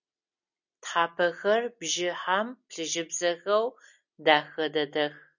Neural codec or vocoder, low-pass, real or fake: none; 7.2 kHz; real